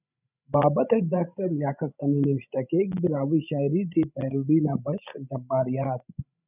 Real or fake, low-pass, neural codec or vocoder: fake; 3.6 kHz; codec, 16 kHz, 16 kbps, FreqCodec, larger model